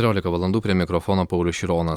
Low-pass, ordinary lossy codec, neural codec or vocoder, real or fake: 19.8 kHz; Opus, 64 kbps; none; real